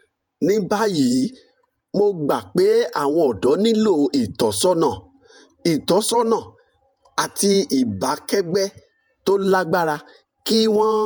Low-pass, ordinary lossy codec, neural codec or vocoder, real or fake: none; none; vocoder, 48 kHz, 128 mel bands, Vocos; fake